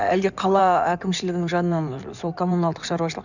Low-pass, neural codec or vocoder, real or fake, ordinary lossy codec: 7.2 kHz; codec, 16 kHz in and 24 kHz out, 2.2 kbps, FireRedTTS-2 codec; fake; none